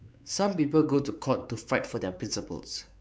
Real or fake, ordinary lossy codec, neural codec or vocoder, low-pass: fake; none; codec, 16 kHz, 4 kbps, X-Codec, WavLM features, trained on Multilingual LibriSpeech; none